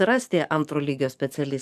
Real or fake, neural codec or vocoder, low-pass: fake; codec, 44.1 kHz, 7.8 kbps, DAC; 14.4 kHz